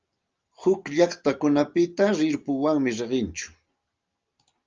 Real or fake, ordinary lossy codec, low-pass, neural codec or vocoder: real; Opus, 24 kbps; 7.2 kHz; none